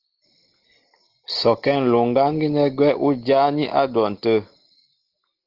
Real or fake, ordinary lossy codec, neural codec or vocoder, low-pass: real; Opus, 16 kbps; none; 5.4 kHz